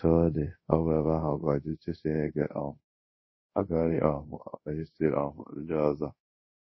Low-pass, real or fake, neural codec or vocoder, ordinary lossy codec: 7.2 kHz; fake; codec, 24 kHz, 0.5 kbps, DualCodec; MP3, 24 kbps